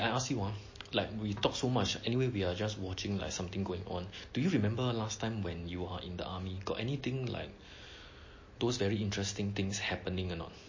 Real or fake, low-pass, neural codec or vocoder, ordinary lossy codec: real; 7.2 kHz; none; MP3, 32 kbps